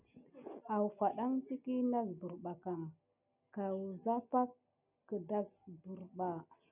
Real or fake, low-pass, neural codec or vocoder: real; 3.6 kHz; none